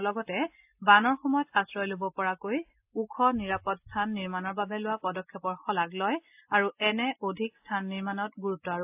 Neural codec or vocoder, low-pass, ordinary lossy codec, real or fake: none; 3.6 kHz; AAC, 32 kbps; real